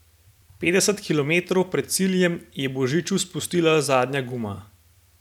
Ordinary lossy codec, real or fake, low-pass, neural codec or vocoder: none; fake; 19.8 kHz; vocoder, 44.1 kHz, 128 mel bands every 512 samples, BigVGAN v2